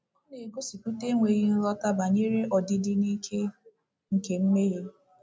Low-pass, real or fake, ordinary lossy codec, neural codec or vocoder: none; real; none; none